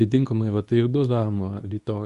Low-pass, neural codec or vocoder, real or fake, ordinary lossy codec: 10.8 kHz; codec, 24 kHz, 0.9 kbps, WavTokenizer, medium speech release version 2; fake; Opus, 64 kbps